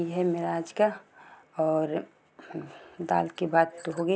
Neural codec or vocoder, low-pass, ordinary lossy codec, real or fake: none; none; none; real